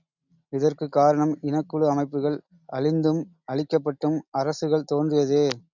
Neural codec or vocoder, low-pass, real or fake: none; 7.2 kHz; real